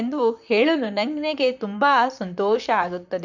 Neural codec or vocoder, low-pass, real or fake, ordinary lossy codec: vocoder, 44.1 kHz, 128 mel bands, Pupu-Vocoder; 7.2 kHz; fake; none